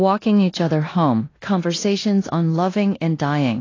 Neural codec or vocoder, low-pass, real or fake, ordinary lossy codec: codec, 16 kHz in and 24 kHz out, 0.9 kbps, LongCat-Audio-Codec, four codebook decoder; 7.2 kHz; fake; AAC, 32 kbps